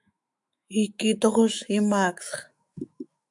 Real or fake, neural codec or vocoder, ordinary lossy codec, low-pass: fake; autoencoder, 48 kHz, 128 numbers a frame, DAC-VAE, trained on Japanese speech; MP3, 96 kbps; 10.8 kHz